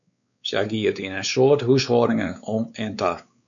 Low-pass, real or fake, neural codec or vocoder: 7.2 kHz; fake; codec, 16 kHz, 4 kbps, X-Codec, WavLM features, trained on Multilingual LibriSpeech